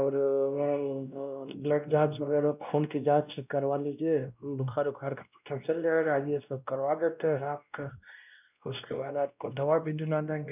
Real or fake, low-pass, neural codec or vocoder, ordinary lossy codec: fake; 3.6 kHz; codec, 16 kHz, 1 kbps, X-Codec, WavLM features, trained on Multilingual LibriSpeech; none